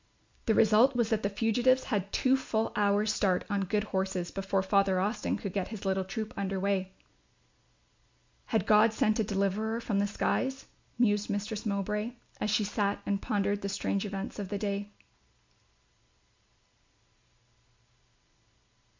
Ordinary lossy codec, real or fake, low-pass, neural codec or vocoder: MP3, 64 kbps; real; 7.2 kHz; none